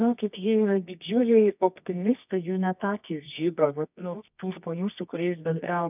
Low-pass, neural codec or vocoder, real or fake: 3.6 kHz; codec, 24 kHz, 0.9 kbps, WavTokenizer, medium music audio release; fake